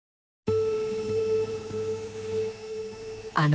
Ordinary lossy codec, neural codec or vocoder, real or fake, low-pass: none; codec, 16 kHz, 4 kbps, X-Codec, HuBERT features, trained on general audio; fake; none